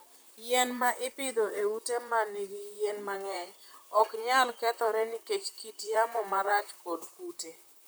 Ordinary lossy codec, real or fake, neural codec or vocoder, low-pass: none; fake; vocoder, 44.1 kHz, 128 mel bands, Pupu-Vocoder; none